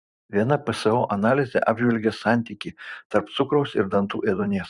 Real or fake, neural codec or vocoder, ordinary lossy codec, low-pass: fake; vocoder, 44.1 kHz, 128 mel bands every 512 samples, BigVGAN v2; Opus, 64 kbps; 10.8 kHz